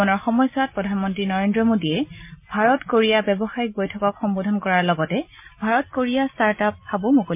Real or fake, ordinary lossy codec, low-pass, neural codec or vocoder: real; MP3, 32 kbps; 3.6 kHz; none